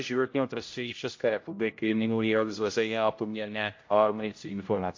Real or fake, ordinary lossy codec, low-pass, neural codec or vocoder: fake; MP3, 48 kbps; 7.2 kHz; codec, 16 kHz, 0.5 kbps, X-Codec, HuBERT features, trained on general audio